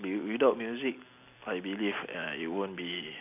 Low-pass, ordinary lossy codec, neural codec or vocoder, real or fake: 3.6 kHz; none; none; real